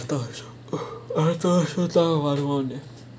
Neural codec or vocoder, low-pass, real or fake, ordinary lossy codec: none; none; real; none